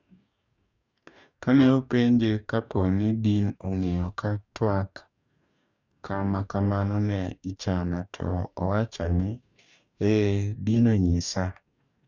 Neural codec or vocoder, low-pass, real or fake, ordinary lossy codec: codec, 44.1 kHz, 2.6 kbps, DAC; 7.2 kHz; fake; none